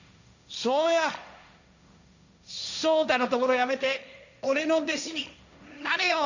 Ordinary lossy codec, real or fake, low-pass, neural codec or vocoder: none; fake; 7.2 kHz; codec, 16 kHz, 1.1 kbps, Voila-Tokenizer